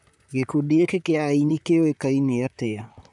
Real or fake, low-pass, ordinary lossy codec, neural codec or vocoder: fake; 10.8 kHz; none; vocoder, 44.1 kHz, 128 mel bands, Pupu-Vocoder